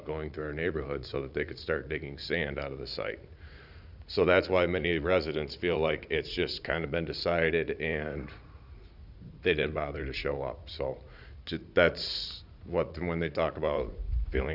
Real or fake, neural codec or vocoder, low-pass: fake; vocoder, 44.1 kHz, 80 mel bands, Vocos; 5.4 kHz